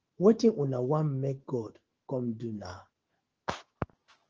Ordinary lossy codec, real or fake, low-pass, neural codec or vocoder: Opus, 16 kbps; fake; 7.2 kHz; autoencoder, 48 kHz, 128 numbers a frame, DAC-VAE, trained on Japanese speech